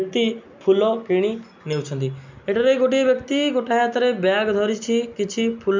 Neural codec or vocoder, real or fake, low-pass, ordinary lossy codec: none; real; 7.2 kHz; MP3, 64 kbps